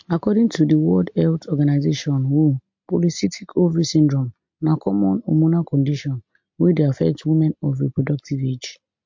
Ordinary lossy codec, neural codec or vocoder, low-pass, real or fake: MP3, 48 kbps; none; 7.2 kHz; real